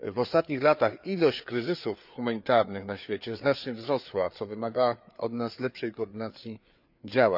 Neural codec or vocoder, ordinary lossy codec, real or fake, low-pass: codec, 16 kHz, 4 kbps, FreqCodec, larger model; none; fake; 5.4 kHz